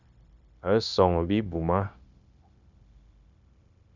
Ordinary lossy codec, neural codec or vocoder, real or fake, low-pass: Opus, 64 kbps; codec, 16 kHz, 0.9 kbps, LongCat-Audio-Codec; fake; 7.2 kHz